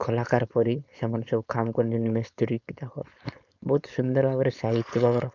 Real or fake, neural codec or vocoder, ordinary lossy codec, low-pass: fake; codec, 16 kHz, 4.8 kbps, FACodec; none; 7.2 kHz